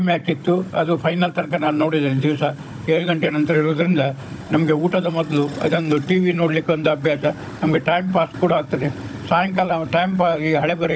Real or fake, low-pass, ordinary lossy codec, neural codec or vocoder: fake; none; none; codec, 16 kHz, 16 kbps, FunCodec, trained on Chinese and English, 50 frames a second